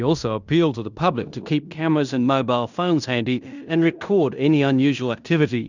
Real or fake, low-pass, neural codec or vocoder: fake; 7.2 kHz; codec, 16 kHz in and 24 kHz out, 0.9 kbps, LongCat-Audio-Codec, fine tuned four codebook decoder